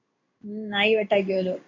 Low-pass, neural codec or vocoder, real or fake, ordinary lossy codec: 7.2 kHz; codec, 16 kHz in and 24 kHz out, 1 kbps, XY-Tokenizer; fake; MP3, 48 kbps